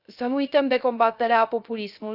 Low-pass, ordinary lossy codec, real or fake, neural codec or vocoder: 5.4 kHz; none; fake; codec, 16 kHz, 0.3 kbps, FocalCodec